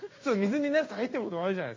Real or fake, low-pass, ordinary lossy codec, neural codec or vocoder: fake; 7.2 kHz; MP3, 32 kbps; codec, 16 kHz in and 24 kHz out, 1 kbps, XY-Tokenizer